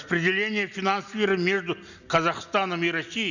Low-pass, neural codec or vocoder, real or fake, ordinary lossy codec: 7.2 kHz; none; real; none